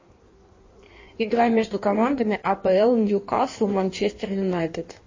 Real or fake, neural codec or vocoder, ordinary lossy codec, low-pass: fake; codec, 16 kHz in and 24 kHz out, 1.1 kbps, FireRedTTS-2 codec; MP3, 32 kbps; 7.2 kHz